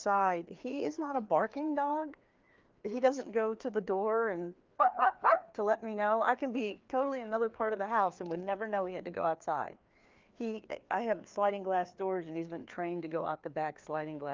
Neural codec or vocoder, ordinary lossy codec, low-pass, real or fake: codec, 16 kHz, 2 kbps, FreqCodec, larger model; Opus, 32 kbps; 7.2 kHz; fake